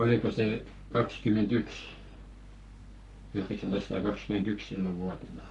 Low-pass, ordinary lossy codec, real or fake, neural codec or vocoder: 10.8 kHz; none; fake; codec, 44.1 kHz, 3.4 kbps, Pupu-Codec